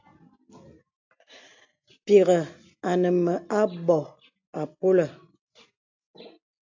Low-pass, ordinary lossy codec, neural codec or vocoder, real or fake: 7.2 kHz; AAC, 48 kbps; none; real